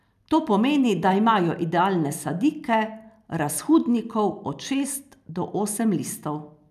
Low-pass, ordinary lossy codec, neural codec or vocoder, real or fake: 14.4 kHz; none; none; real